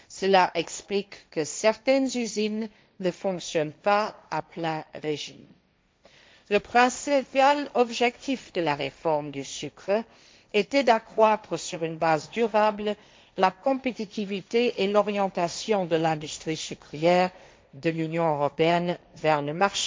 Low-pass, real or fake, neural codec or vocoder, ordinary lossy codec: none; fake; codec, 16 kHz, 1.1 kbps, Voila-Tokenizer; none